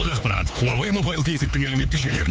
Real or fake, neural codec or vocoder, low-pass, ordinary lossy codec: fake; codec, 16 kHz, 4 kbps, X-Codec, HuBERT features, trained on LibriSpeech; none; none